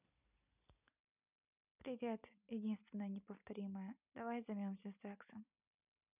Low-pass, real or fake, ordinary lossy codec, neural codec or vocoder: 3.6 kHz; real; none; none